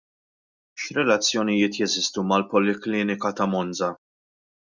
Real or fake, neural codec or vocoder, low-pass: real; none; 7.2 kHz